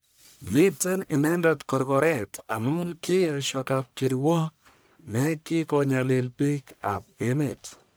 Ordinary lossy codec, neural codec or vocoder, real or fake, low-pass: none; codec, 44.1 kHz, 1.7 kbps, Pupu-Codec; fake; none